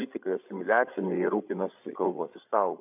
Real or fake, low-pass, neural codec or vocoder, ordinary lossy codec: fake; 3.6 kHz; codec, 16 kHz, 16 kbps, FreqCodec, larger model; AAC, 32 kbps